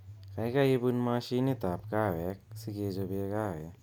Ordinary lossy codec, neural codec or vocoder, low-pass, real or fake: none; none; 19.8 kHz; real